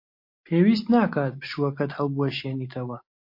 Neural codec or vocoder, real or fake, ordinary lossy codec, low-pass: none; real; MP3, 32 kbps; 5.4 kHz